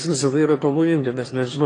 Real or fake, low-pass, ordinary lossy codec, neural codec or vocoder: fake; 9.9 kHz; AAC, 32 kbps; autoencoder, 22.05 kHz, a latent of 192 numbers a frame, VITS, trained on one speaker